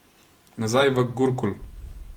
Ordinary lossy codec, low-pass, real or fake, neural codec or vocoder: Opus, 24 kbps; 19.8 kHz; fake; vocoder, 44.1 kHz, 128 mel bands every 512 samples, BigVGAN v2